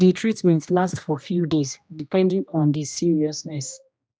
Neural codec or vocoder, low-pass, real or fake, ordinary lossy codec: codec, 16 kHz, 1 kbps, X-Codec, HuBERT features, trained on general audio; none; fake; none